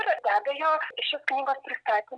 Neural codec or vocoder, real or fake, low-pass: none; real; 9.9 kHz